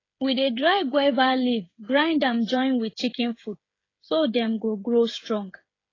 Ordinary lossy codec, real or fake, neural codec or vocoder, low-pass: AAC, 32 kbps; fake; codec, 16 kHz, 8 kbps, FreqCodec, smaller model; 7.2 kHz